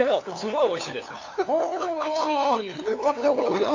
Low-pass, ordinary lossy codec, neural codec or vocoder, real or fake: 7.2 kHz; AAC, 48 kbps; codec, 16 kHz, 2 kbps, FunCodec, trained on LibriTTS, 25 frames a second; fake